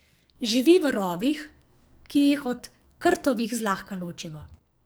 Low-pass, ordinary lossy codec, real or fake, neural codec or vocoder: none; none; fake; codec, 44.1 kHz, 2.6 kbps, SNAC